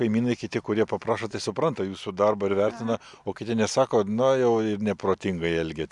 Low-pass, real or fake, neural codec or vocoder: 10.8 kHz; real; none